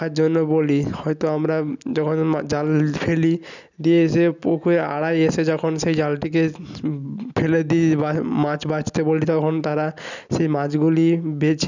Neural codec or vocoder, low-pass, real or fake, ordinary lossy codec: none; 7.2 kHz; real; none